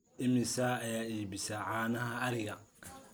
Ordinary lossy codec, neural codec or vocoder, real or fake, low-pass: none; none; real; none